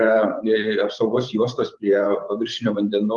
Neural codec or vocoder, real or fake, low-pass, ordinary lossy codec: vocoder, 24 kHz, 100 mel bands, Vocos; fake; 10.8 kHz; Opus, 24 kbps